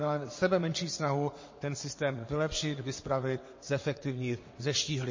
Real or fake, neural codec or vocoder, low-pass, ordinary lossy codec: fake; vocoder, 22.05 kHz, 80 mel bands, WaveNeXt; 7.2 kHz; MP3, 32 kbps